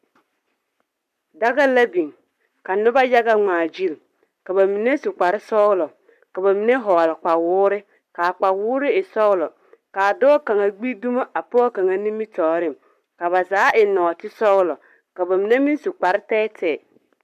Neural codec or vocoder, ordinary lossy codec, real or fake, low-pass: codec, 44.1 kHz, 7.8 kbps, Pupu-Codec; MP3, 96 kbps; fake; 14.4 kHz